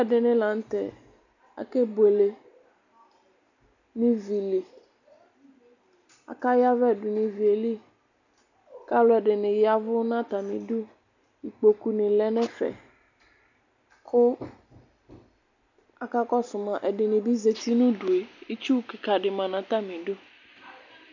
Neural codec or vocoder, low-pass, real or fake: none; 7.2 kHz; real